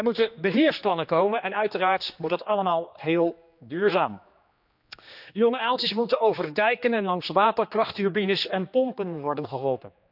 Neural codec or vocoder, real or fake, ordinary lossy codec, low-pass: codec, 16 kHz, 2 kbps, X-Codec, HuBERT features, trained on general audio; fake; none; 5.4 kHz